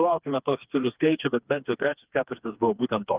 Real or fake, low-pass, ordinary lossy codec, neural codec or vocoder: fake; 3.6 kHz; Opus, 32 kbps; codec, 16 kHz, 2 kbps, FreqCodec, smaller model